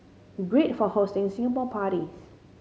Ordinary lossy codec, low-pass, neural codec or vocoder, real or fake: none; none; none; real